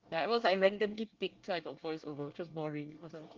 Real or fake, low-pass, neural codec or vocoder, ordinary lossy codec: fake; 7.2 kHz; codec, 24 kHz, 1 kbps, SNAC; Opus, 32 kbps